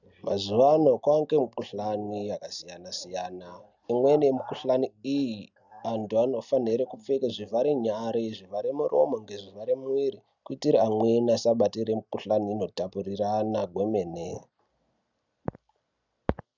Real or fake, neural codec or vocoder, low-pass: real; none; 7.2 kHz